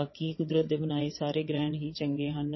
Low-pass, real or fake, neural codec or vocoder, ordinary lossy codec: 7.2 kHz; fake; vocoder, 22.05 kHz, 80 mel bands, WaveNeXt; MP3, 24 kbps